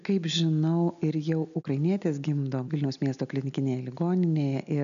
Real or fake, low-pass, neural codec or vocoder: real; 7.2 kHz; none